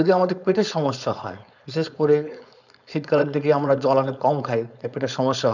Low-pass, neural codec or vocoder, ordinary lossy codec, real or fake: 7.2 kHz; codec, 16 kHz, 4.8 kbps, FACodec; none; fake